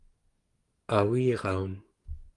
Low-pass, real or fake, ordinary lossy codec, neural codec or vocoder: 10.8 kHz; fake; Opus, 24 kbps; codec, 44.1 kHz, 7.8 kbps, DAC